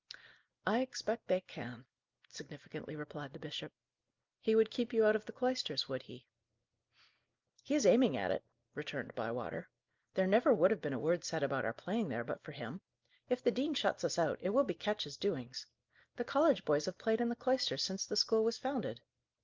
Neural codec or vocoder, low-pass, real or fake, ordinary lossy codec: none; 7.2 kHz; real; Opus, 24 kbps